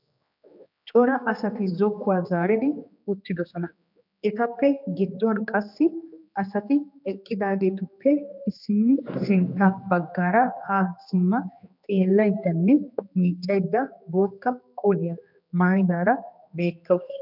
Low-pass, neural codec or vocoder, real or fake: 5.4 kHz; codec, 16 kHz, 2 kbps, X-Codec, HuBERT features, trained on general audio; fake